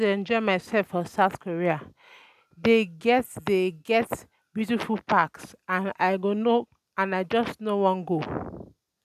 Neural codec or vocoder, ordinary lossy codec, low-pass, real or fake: none; none; 14.4 kHz; real